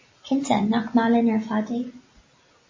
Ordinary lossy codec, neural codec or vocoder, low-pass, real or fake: MP3, 32 kbps; none; 7.2 kHz; real